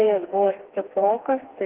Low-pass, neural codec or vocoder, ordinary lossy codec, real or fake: 3.6 kHz; codec, 16 kHz, 2 kbps, FreqCodec, smaller model; Opus, 16 kbps; fake